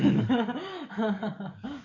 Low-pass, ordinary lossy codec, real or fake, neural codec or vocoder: 7.2 kHz; none; fake; codec, 44.1 kHz, 7.8 kbps, DAC